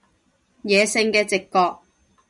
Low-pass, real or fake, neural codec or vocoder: 10.8 kHz; real; none